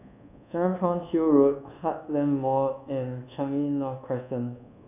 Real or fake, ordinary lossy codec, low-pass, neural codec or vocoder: fake; none; 3.6 kHz; codec, 24 kHz, 1.2 kbps, DualCodec